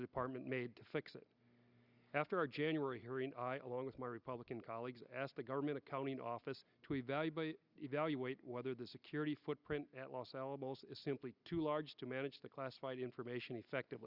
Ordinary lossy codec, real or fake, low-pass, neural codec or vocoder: Opus, 64 kbps; real; 5.4 kHz; none